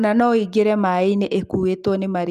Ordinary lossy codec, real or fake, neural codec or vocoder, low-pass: Opus, 32 kbps; real; none; 14.4 kHz